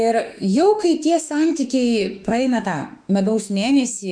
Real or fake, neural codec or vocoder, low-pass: fake; autoencoder, 48 kHz, 32 numbers a frame, DAC-VAE, trained on Japanese speech; 9.9 kHz